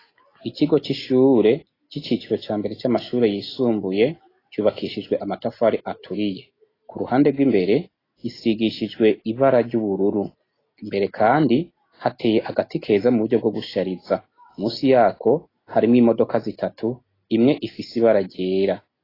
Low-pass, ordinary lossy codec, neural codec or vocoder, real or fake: 5.4 kHz; AAC, 24 kbps; none; real